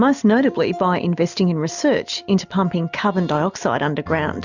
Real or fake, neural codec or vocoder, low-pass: real; none; 7.2 kHz